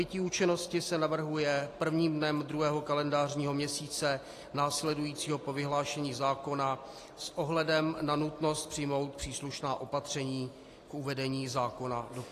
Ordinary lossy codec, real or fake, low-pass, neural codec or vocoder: AAC, 48 kbps; real; 14.4 kHz; none